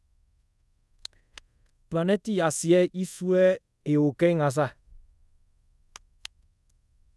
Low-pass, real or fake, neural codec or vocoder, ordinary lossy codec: none; fake; codec, 24 kHz, 0.5 kbps, DualCodec; none